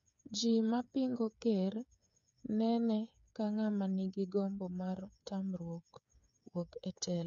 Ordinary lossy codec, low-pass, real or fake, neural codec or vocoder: none; 7.2 kHz; fake; codec, 16 kHz, 8 kbps, FreqCodec, smaller model